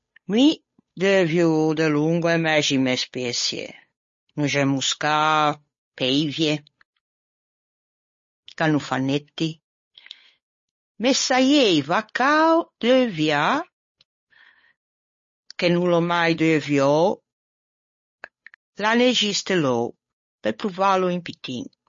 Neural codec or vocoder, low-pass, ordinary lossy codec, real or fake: codec, 16 kHz, 8 kbps, FunCodec, trained on Chinese and English, 25 frames a second; 7.2 kHz; MP3, 32 kbps; fake